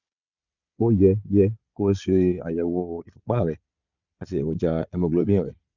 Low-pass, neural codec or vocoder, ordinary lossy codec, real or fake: 7.2 kHz; vocoder, 22.05 kHz, 80 mel bands, Vocos; none; fake